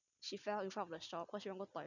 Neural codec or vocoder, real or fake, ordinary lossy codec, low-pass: none; real; none; 7.2 kHz